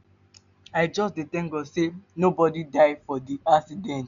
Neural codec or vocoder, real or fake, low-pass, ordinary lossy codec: none; real; 7.2 kHz; none